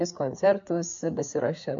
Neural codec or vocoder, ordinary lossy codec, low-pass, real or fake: codec, 16 kHz, 4 kbps, FreqCodec, larger model; AAC, 64 kbps; 7.2 kHz; fake